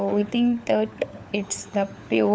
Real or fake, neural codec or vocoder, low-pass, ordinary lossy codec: fake; codec, 16 kHz, 4 kbps, FunCodec, trained on LibriTTS, 50 frames a second; none; none